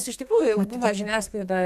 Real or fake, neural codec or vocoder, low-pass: fake; codec, 32 kHz, 1.9 kbps, SNAC; 14.4 kHz